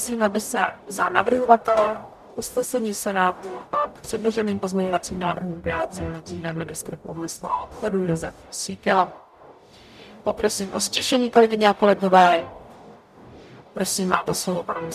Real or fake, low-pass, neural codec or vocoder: fake; 14.4 kHz; codec, 44.1 kHz, 0.9 kbps, DAC